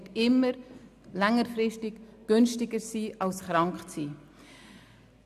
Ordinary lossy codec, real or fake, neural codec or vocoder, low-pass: none; real; none; 14.4 kHz